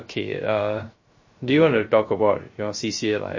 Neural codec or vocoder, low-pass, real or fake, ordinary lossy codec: codec, 16 kHz, 0.3 kbps, FocalCodec; 7.2 kHz; fake; MP3, 32 kbps